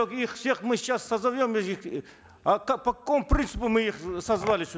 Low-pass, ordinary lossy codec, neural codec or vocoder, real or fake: none; none; none; real